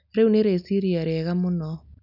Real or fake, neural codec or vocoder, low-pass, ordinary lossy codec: real; none; 5.4 kHz; none